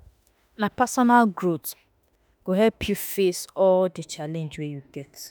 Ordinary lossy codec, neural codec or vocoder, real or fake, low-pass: none; autoencoder, 48 kHz, 32 numbers a frame, DAC-VAE, trained on Japanese speech; fake; none